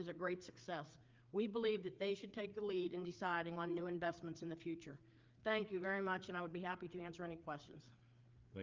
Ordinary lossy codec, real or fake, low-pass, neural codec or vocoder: Opus, 24 kbps; fake; 7.2 kHz; codec, 16 kHz, 4 kbps, FreqCodec, larger model